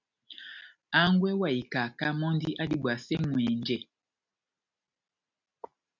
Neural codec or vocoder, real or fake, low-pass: none; real; 7.2 kHz